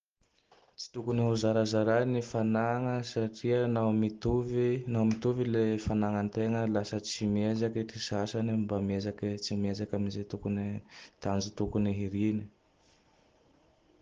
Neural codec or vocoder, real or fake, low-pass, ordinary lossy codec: none; real; 7.2 kHz; Opus, 16 kbps